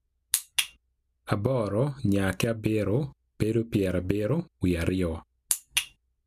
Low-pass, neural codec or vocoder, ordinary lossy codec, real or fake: 14.4 kHz; none; MP3, 96 kbps; real